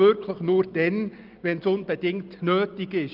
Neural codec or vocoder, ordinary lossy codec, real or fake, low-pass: none; Opus, 32 kbps; real; 5.4 kHz